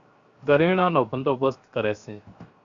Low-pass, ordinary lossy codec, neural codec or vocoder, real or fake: 7.2 kHz; Opus, 64 kbps; codec, 16 kHz, 0.7 kbps, FocalCodec; fake